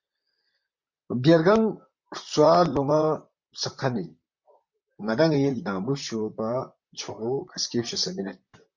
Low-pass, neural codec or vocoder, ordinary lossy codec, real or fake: 7.2 kHz; vocoder, 44.1 kHz, 128 mel bands, Pupu-Vocoder; MP3, 64 kbps; fake